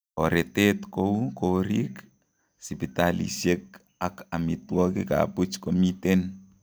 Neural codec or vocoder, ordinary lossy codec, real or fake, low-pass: none; none; real; none